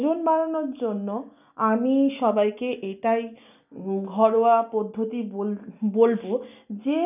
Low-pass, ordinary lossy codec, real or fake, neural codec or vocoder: 3.6 kHz; none; real; none